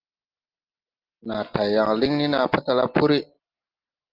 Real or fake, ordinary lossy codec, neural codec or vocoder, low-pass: real; Opus, 32 kbps; none; 5.4 kHz